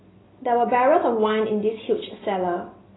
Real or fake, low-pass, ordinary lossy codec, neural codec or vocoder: real; 7.2 kHz; AAC, 16 kbps; none